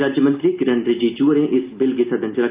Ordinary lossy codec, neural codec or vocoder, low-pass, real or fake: Opus, 24 kbps; none; 3.6 kHz; real